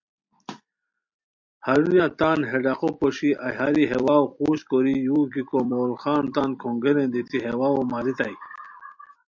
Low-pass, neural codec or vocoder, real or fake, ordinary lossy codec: 7.2 kHz; vocoder, 44.1 kHz, 128 mel bands every 256 samples, BigVGAN v2; fake; MP3, 48 kbps